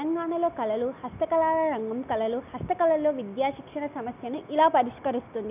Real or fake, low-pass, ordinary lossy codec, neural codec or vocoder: real; 3.6 kHz; none; none